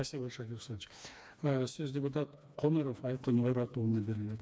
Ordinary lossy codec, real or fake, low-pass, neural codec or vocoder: none; fake; none; codec, 16 kHz, 2 kbps, FreqCodec, smaller model